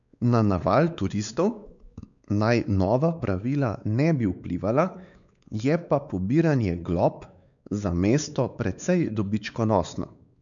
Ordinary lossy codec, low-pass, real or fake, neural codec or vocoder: none; 7.2 kHz; fake; codec, 16 kHz, 4 kbps, X-Codec, WavLM features, trained on Multilingual LibriSpeech